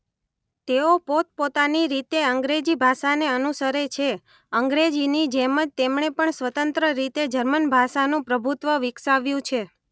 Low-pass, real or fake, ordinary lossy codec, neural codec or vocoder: none; real; none; none